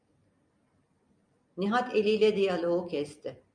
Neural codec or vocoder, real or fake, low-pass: none; real; 9.9 kHz